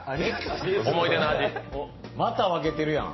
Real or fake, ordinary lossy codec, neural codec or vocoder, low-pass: real; MP3, 24 kbps; none; 7.2 kHz